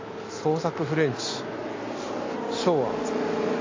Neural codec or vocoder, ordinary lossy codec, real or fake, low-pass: none; AAC, 32 kbps; real; 7.2 kHz